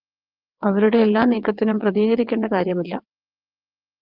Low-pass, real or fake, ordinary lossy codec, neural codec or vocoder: 5.4 kHz; fake; Opus, 32 kbps; vocoder, 22.05 kHz, 80 mel bands, WaveNeXt